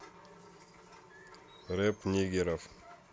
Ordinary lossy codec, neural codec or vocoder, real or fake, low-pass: none; none; real; none